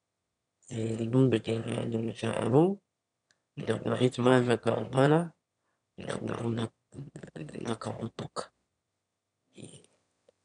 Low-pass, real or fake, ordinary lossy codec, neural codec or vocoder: 9.9 kHz; fake; none; autoencoder, 22.05 kHz, a latent of 192 numbers a frame, VITS, trained on one speaker